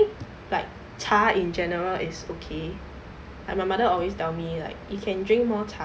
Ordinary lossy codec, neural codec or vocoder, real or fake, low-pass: none; none; real; none